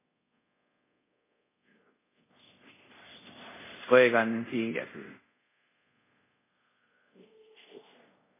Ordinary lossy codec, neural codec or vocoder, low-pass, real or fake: MP3, 16 kbps; codec, 24 kHz, 0.5 kbps, DualCodec; 3.6 kHz; fake